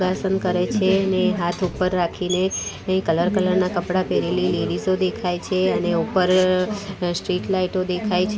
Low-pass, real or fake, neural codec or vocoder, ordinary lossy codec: none; real; none; none